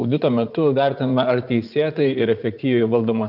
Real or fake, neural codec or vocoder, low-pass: fake; vocoder, 44.1 kHz, 80 mel bands, Vocos; 5.4 kHz